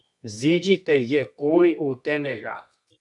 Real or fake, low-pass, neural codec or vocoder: fake; 10.8 kHz; codec, 24 kHz, 0.9 kbps, WavTokenizer, medium music audio release